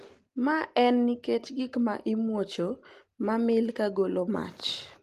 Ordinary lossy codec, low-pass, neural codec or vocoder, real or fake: Opus, 24 kbps; 14.4 kHz; none; real